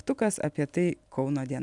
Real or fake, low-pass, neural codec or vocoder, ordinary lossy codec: real; 10.8 kHz; none; MP3, 96 kbps